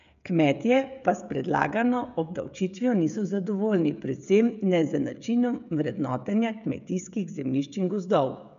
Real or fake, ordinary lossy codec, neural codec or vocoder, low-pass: fake; none; codec, 16 kHz, 16 kbps, FreqCodec, smaller model; 7.2 kHz